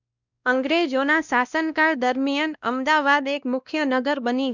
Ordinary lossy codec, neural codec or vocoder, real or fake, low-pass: none; codec, 16 kHz, 1 kbps, X-Codec, WavLM features, trained on Multilingual LibriSpeech; fake; 7.2 kHz